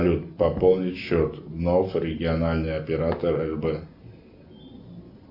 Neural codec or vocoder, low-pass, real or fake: none; 5.4 kHz; real